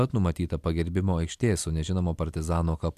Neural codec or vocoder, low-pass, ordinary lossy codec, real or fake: vocoder, 44.1 kHz, 128 mel bands every 256 samples, BigVGAN v2; 14.4 kHz; AAC, 96 kbps; fake